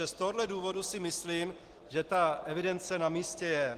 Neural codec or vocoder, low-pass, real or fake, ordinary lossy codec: none; 14.4 kHz; real; Opus, 16 kbps